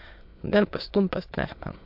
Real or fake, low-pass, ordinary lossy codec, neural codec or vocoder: fake; 5.4 kHz; MP3, 48 kbps; autoencoder, 22.05 kHz, a latent of 192 numbers a frame, VITS, trained on many speakers